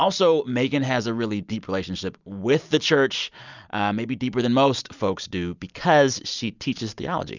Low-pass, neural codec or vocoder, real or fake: 7.2 kHz; none; real